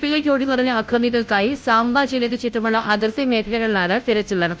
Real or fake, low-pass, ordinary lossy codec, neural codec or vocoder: fake; none; none; codec, 16 kHz, 0.5 kbps, FunCodec, trained on Chinese and English, 25 frames a second